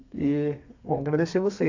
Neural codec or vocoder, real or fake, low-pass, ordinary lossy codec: codec, 32 kHz, 1.9 kbps, SNAC; fake; 7.2 kHz; AAC, 48 kbps